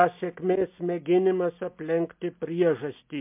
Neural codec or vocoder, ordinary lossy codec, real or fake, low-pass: none; MP3, 32 kbps; real; 3.6 kHz